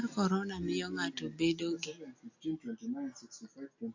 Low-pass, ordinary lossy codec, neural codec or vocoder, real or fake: 7.2 kHz; AAC, 48 kbps; none; real